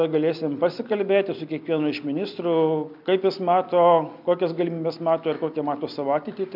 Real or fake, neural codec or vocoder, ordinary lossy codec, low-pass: real; none; MP3, 48 kbps; 5.4 kHz